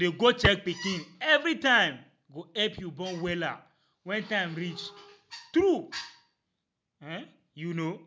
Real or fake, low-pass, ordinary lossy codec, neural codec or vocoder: real; none; none; none